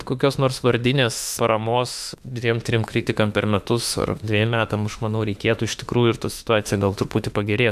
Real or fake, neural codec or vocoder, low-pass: fake; autoencoder, 48 kHz, 32 numbers a frame, DAC-VAE, trained on Japanese speech; 14.4 kHz